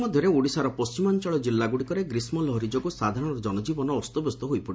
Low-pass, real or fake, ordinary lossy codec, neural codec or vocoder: none; real; none; none